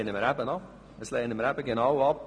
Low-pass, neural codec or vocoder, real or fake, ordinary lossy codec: none; none; real; none